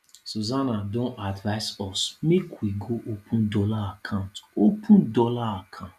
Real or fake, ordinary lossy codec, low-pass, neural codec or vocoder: real; none; 14.4 kHz; none